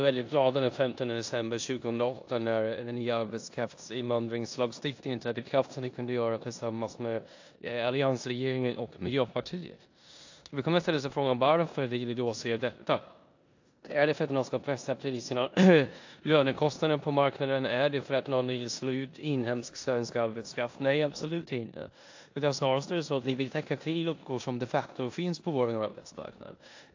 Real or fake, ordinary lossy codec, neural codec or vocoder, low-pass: fake; AAC, 48 kbps; codec, 16 kHz in and 24 kHz out, 0.9 kbps, LongCat-Audio-Codec, four codebook decoder; 7.2 kHz